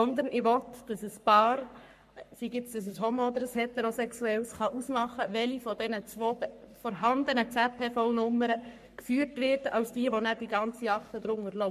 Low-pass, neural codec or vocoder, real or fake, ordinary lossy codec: 14.4 kHz; codec, 44.1 kHz, 3.4 kbps, Pupu-Codec; fake; MP3, 64 kbps